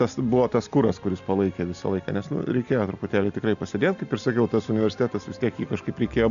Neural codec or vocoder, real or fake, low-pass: none; real; 7.2 kHz